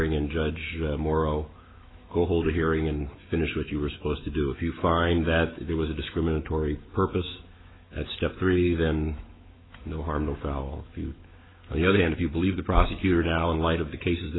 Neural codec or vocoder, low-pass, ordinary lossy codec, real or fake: autoencoder, 48 kHz, 128 numbers a frame, DAC-VAE, trained on Japanese speech; 7.2 kHz; AAC, 16 kbps; fake